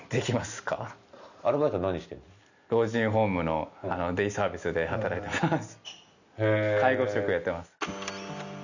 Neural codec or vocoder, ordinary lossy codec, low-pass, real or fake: none; none; 7.2 kHz; real